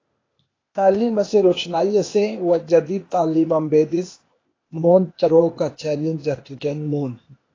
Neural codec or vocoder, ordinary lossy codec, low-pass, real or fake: codec, 16 kHz, 0.8 kbps, ZipCodec; AAC, 32 kbps; 7.2 kHz; fake